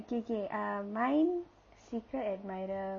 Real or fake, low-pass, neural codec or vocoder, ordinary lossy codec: real; 7.2 kHz; none; MP3, 32 kbps